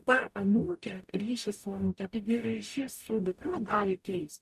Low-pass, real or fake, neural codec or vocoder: 14.4 kHz; fake; codec, 44.1 kHz, 0.9 kbps, DAC